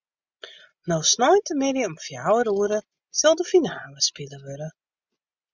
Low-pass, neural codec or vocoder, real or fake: 7.2 kHz; none; real